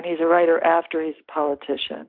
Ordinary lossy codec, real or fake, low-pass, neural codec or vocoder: MP3, 48 kbps; real; 5.4 kHz; none